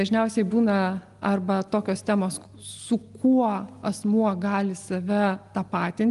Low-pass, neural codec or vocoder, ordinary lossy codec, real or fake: 10.8 kHz; none; Opus, 32 kbps; real